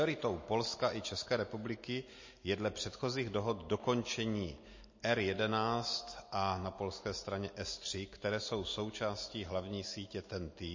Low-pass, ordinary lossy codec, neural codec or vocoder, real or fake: 7.2 kHz; MP3, 32 kbps; none; real